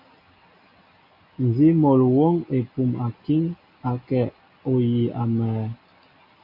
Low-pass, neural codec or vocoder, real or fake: 5.4 kHz; none; real